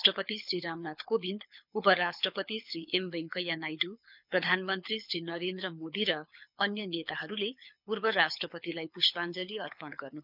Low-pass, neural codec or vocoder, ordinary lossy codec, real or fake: 5.4 kHz; codec, 24 kHz, 6 kbps, HILCodec; none; fake